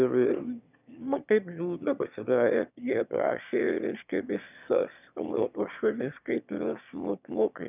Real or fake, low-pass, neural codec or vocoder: fake; 3.6 kHz; autoencoder, 22.05 kHz, a latent of 192 numbers a frame, VITS, trained on one speaker